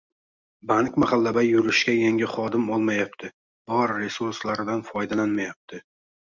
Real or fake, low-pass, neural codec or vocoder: real; 7.2 kHz; none